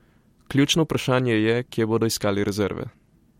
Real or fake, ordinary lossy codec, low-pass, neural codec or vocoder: fake; MP3, 64 kbps; 19.8 kHz; vocoder, 44.1 kHz, 128 mel bands every 256 samples, BigVGAN v2